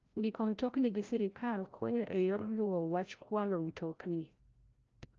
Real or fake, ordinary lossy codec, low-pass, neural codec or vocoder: fake; Opus, 24 kbps; 7.2 kHz; codec, 16 kHz, 0.5 kbps, FreqCodec, larger model